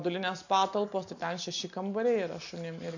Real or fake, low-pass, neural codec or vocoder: real; 7.2 kHz; none